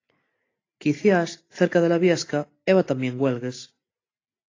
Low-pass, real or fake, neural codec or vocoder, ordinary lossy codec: 7.2 kHz; real; none; AAC, 32 kbps